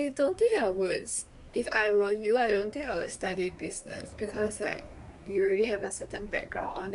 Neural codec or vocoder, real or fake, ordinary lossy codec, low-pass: codec, 24 kHz, 1 kbps, SNAC; fake; none; 10.8 kHz